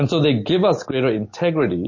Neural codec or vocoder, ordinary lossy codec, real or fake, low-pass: none; MP3, 32 kbps; real; 7.2 kHz